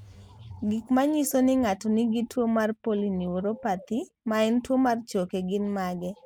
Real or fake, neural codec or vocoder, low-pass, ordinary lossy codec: real; none; 19.8 kHz; none